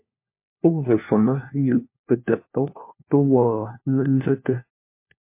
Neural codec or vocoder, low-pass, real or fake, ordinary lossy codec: codec, 16 kHz, 1 kbps, FunCodec, trained on LibriTTS, 50 frames a second; 3.6 kHz; fake; MP3, 24 kbps